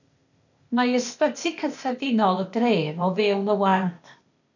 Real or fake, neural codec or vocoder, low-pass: fake; codec, 16 kHz, 0.8 kbps, ZipCodec; 7.2 kHz